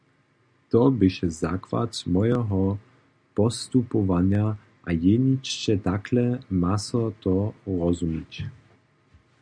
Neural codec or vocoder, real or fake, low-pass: none; real; 9.9 kHz